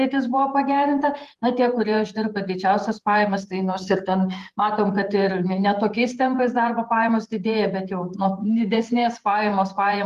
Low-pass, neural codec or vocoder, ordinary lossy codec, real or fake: 14.4 kHz; vocoder, 48 kHz, 128 mel bands, Vocos; Opus, 24 kbps; fake